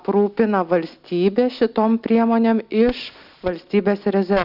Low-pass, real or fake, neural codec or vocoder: 5.4 kHz; real; none